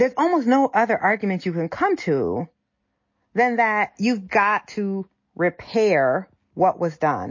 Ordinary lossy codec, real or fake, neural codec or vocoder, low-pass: MP3, 32 kbps; real; none; 7.2 kHz